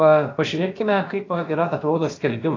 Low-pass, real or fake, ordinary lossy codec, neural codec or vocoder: 7.2 kHz; fake; AAC, 32 kbps; codec, 16 kHz, about 1 kbps, DyCAST, with the encoder's durations